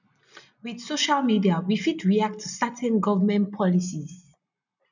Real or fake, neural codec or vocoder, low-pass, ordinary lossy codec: real; none; 7.2 kHz; none